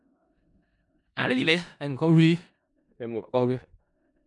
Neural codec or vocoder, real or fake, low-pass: codec, 16 kHz in and 24 kHz out, 0.4 kbps, LongCat-Audio-Codec, four codebook decoder; fake; 10.8 kHz